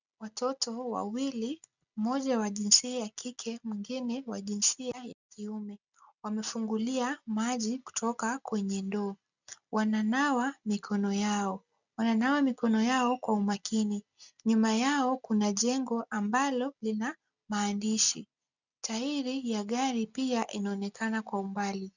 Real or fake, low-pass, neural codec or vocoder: real; 7.2 kHz; none